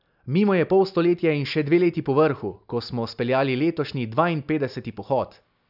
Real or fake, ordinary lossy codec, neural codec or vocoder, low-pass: real; none; none; 5.4 kHz